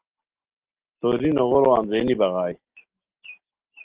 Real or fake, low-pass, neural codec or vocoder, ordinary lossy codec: real; 3.6 kHz; none; Opus, 16 kbps